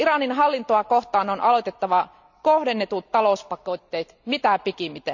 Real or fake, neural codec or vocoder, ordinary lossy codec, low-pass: real; none; none; 7.2 kHz